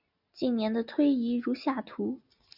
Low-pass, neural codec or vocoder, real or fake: 5.4 kHz; none; real